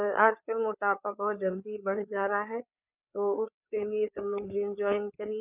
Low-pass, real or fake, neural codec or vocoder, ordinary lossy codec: 3.6 kHz; fake; codec, 16 kHz, 4 kbps, FreqCodec, larger model; none